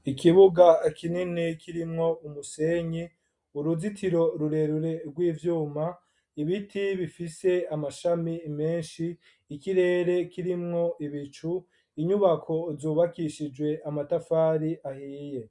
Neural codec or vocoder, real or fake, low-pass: none; real; 10.8 kHz